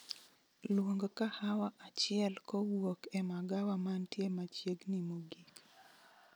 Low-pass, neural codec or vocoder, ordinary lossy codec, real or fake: none; none; none; real